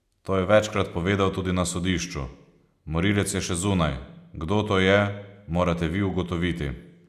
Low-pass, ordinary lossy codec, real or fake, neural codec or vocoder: 14.4 kHz; none; real; none